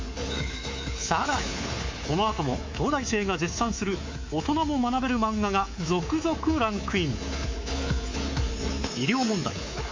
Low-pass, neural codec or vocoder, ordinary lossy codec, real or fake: 7.2 kHz; codec, 24 kHz, 3.1 kbps, DualCodec; MP3, 48 kbps; fake